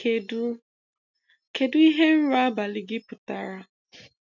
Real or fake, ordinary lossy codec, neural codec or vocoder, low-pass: real; none; none; 7.2 kHz